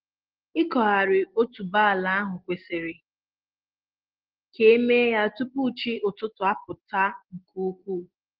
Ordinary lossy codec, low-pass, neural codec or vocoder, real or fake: Opus, 16 kbps; 5.4 kHz; none; real